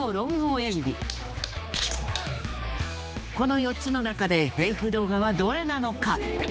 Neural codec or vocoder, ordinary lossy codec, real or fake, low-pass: codec, 16 kHz, 2 kbps, X-Codec, HuBERT features, trained on general audio; none; fake; none